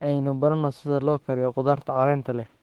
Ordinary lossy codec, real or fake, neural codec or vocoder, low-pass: Opus, 16 kbps; fake; autoencoder, 48 kHz, 32 numbers a frame, DAC-VAE, trained on Japanese speech; 19.8 kHz